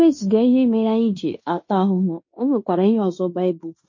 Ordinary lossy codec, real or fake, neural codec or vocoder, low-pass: MP3, 32 kbps; fake; codec, 16 kHz in and 24 kHz out, 0.9 kbps, LongCat-Audio-Codec, fine tuned four codebook decoder; 7.2 kHz